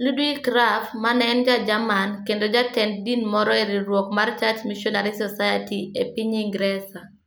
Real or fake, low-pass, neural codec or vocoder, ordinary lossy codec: real; none; none; none